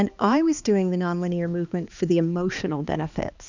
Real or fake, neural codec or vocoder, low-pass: fake; codec, 16 kHz, 2 kbps, X-Codec, HuBERT features, trained on balanced general audio; 7.2 kHz